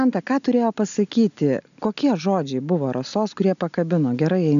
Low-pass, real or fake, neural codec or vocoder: 7.2 kHz; real; none